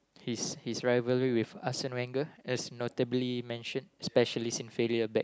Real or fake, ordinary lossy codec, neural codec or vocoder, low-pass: real; none; none; none